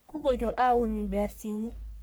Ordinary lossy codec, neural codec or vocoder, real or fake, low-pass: none; codec, 44.1 kHz, 1.7 kbps, Pupu-Codec; fake; none